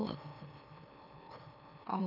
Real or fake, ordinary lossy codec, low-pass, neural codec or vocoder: fake; none; 5.4 kHz; autoencoder, 44.1 kHz, a latent of 192 numbers a frame, MeloTTS